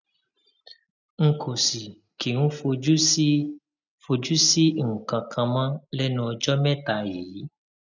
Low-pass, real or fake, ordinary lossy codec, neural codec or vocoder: 7.2 kHz; real; none; none